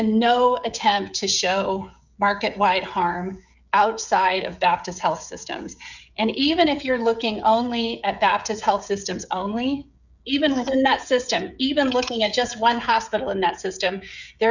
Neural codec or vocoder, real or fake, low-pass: codec, 16 kHz, 16 kbps, FreqCodec, smaller model; fake; 7.2 kHz